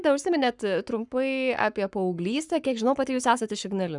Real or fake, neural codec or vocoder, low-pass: fake; codec, 44.1 kHz, 7.8 kbps, Pupu-Codec; 10.8 kHz